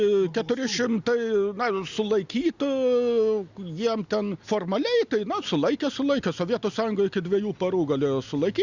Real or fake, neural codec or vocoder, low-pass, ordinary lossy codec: real; none; 7.2 kHz; Opus, 64 kbps